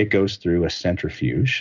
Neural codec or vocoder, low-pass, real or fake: none; 7.2 kHz; real